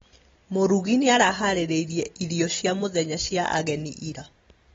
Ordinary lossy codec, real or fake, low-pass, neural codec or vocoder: AAC, 24 kbps; real; 7.2 kHz; none